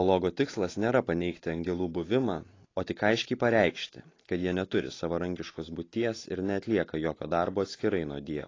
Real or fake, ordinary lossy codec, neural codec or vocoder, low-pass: real; AAC, 32 kbps; none; 7.2 kHz